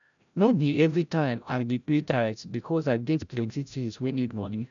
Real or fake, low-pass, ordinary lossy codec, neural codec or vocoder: fake; 7.2 kHz; none; codec, 16 kHz, 0.5 kbps, FreqCodec, larger model